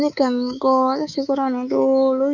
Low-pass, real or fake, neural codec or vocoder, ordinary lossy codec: 7.2 kHz; fake; codec, 24 kHz, 3.1 kbps, DualCodec; none